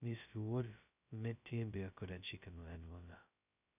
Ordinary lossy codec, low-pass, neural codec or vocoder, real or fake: none; 3.6 kHz; codec, 16 kHz, 0.2 kbps, FocalCodec; fake